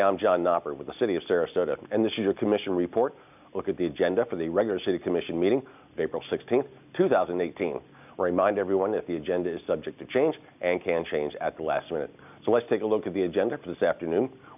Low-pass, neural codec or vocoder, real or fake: 3.6 kHz; none; real